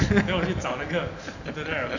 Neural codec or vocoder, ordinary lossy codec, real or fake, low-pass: none; none; real; 7.2 kHz